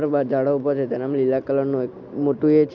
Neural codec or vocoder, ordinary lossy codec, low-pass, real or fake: none; MP3, 64 kbps; 7.2 kHz; real